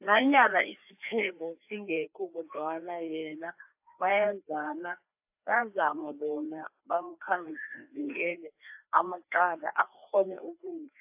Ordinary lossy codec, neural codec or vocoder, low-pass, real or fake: AAC, 32 kbps; codec, 16 kHz, 2 kbps, FreqCodec, larger model; 3.6 kHz; fake